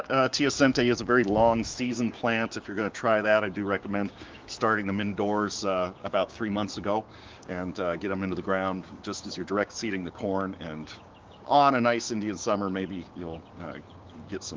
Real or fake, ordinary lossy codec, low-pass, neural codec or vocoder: fake; Opus, 32 kbps; 7.2 kHz; codec, 16 kHz, 6 kbps, DAC